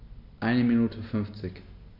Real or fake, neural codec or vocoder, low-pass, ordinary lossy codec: real; none; 5.4 kHz; MP3, 32 kbps